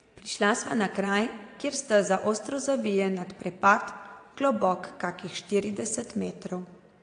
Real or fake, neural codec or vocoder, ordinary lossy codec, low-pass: fake; vocoder, 22.05 kHz, 80 mel bands, Vocos; AAC, 48 kbps; 9.9 kHz